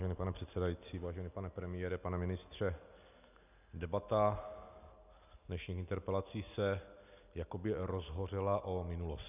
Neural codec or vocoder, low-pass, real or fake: none; 3.6 kHz; real